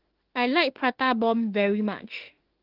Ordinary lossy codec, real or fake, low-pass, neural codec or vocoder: Opus, 16 kbps; fake; 5.4 kHz; autoencoder, 48 kHz, 32 numbers a frame, DAC-VAE, trained on Japanese speech